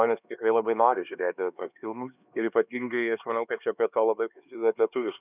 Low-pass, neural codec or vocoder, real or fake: 3.6 kHz; codec, 16 kHz, 2 kbps, X-Codec, HuBERT features, trained on LibriSpeech; fake